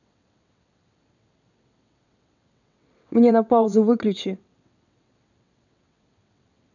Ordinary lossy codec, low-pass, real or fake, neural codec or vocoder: none; 7.2 kHz; fake; vocoder, 22.05 kHz, 80 mel bands, WaveNeXt